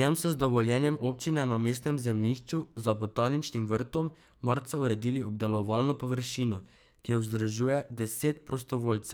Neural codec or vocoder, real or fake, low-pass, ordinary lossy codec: codec, 44.1 kHz, 2.6 kbps, SNAC; fake; none; none